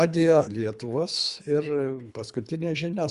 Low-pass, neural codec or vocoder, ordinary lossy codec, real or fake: 10.8 kHz; codec, 24 kHz, 3 kbps, HILCodec; Opus, 64 kbps; fake